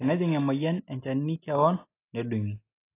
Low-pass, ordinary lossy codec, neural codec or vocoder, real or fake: 3.6 kHz; AAC, 24 kbps; none; real